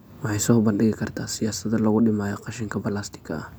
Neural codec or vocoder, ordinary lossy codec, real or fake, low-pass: none; none; real; none